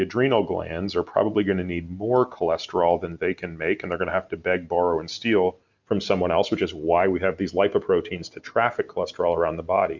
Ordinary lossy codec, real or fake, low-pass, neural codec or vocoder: Opus, 64 kbps; real; 7.2 kHz; none